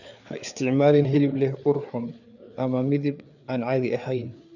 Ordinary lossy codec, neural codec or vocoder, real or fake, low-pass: none; codec, 16 kHz, 4 kbps, FreqCodec, larger model; fake; 7.2 kHz